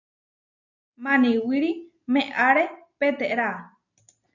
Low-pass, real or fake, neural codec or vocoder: 7.2 kHz; real; none